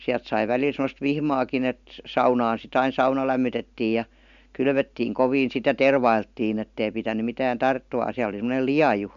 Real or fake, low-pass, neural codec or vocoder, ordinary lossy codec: real; 7.2 kHz; none; MP3, 64 kbps